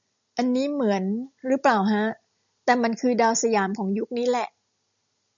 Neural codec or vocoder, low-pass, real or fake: none; 7.2 kHz; real